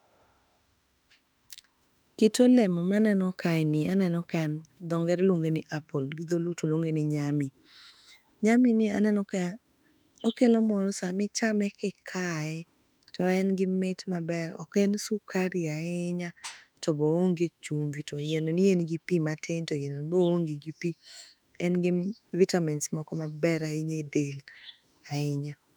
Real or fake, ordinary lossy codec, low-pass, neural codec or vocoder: fake; none; 19.8 kHz; autoencoder, 48 kHz, 32 numbers a frame, DAC-VAE, trained on Japanese speech